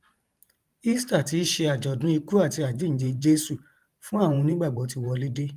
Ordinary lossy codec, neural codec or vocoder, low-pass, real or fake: Opus, 32 kbps; vocoder, 44.1 kHz, 128 mel bands every 512 samples, BigVGAN v2; 14.4 kHz; fake